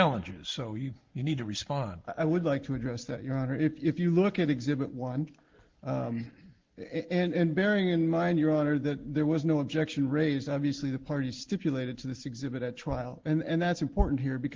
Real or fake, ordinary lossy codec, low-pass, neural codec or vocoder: real; Opus, 16 kbps; 7.2 kHz; none